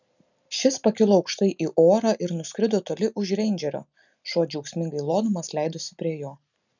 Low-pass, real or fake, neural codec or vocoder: 7.2 kHz; real; none